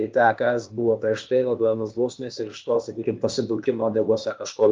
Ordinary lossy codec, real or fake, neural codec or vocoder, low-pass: Opus, 32 kbps; fake; codec, 16 kHz, 0.8 kbps, ZipCodec; 7.2 kHz